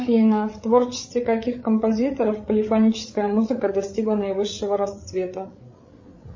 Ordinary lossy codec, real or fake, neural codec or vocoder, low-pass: MP3, 32 kbps; fake; codec, 16 kHz, 8 kbps, FreqCodec, larger model; 7.2 kHz